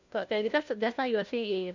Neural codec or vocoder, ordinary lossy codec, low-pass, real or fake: codec, 16 kHz, 1 kbps, FunCodec, trained on LibriTTS, 50 frames a second; Opus, 64 kbps; 7.2 kHz; fake